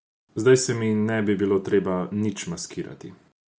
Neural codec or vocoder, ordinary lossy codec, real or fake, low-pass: none; none; real; none